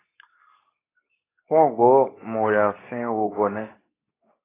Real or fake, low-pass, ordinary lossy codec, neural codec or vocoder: fake; 3.6 kHz; AAC, 16 kbps; codec, 24 kHz, 0.9 kbps, WavTokenizer, medium speech release version 2